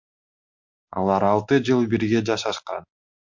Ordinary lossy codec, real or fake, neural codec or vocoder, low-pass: MP3, 48 kbps; real; none; 7.2 kHz